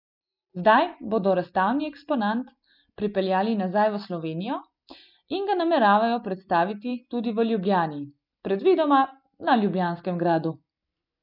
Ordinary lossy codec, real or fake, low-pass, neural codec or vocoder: none; real; 5.4 kHz; none